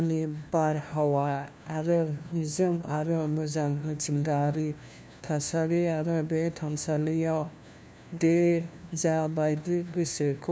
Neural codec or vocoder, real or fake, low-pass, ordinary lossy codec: codec, 16 kHz, 1 kbps, FunCodec, trained on LibriTTS, 50 frames a second; fake; none; none